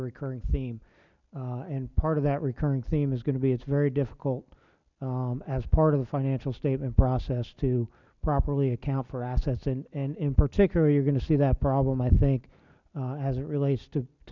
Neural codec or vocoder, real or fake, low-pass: none; real; 7.2 kHz